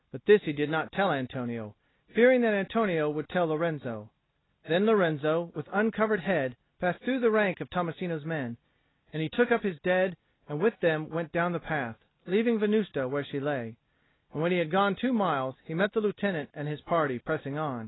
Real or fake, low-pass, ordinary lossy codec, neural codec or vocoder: real; 7.2 kHz; AAC, 16 kbps; none